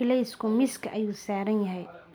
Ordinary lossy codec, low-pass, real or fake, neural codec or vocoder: none; none; real; none